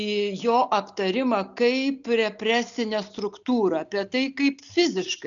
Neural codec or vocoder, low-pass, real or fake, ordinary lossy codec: none; 7.2 kHz; real; MP3, 96 kbps